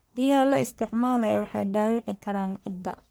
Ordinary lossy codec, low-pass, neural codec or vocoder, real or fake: none; none; codec, 44.1 kHz, 1.7 kbps, Pupu-Codec; fake